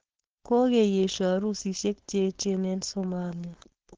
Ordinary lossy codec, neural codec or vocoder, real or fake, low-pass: Opus, 16 kbps; codec, 16 kHz, 4.8 kbps, FACodec; fake; 7.2 kHz